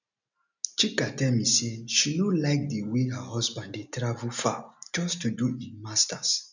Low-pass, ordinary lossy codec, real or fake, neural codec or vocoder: 7.2 kHz; none; real; none